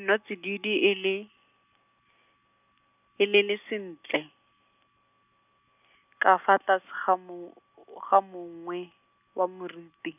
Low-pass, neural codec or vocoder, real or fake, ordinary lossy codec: 3.6 kHz; none; real; none